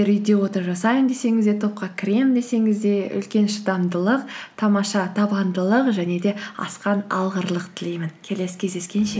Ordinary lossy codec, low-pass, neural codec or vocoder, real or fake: none; none; none; real